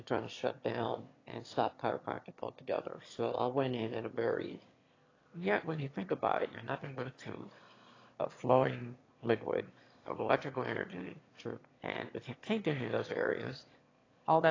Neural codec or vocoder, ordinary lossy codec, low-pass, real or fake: autoencoder, 22.05 kHz, a latent of 192 numbers a frame, VITS, trained on one speaker; AAC, 32 kbps; 7.2 kHz; fake